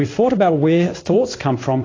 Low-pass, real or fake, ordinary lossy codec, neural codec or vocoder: 7.2 kHz; fake; AAC, 32 kbps; codec, 16 kHz in and 24 kHz out, 1 kbps, XY-Tokenizer